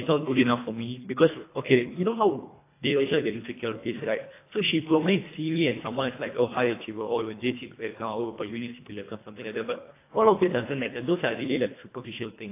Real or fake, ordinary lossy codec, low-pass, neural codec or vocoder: fake; AAC, 24 kbps; 3.6 kHz; codec, 24 kHz, 1.5 kbps, HILCodec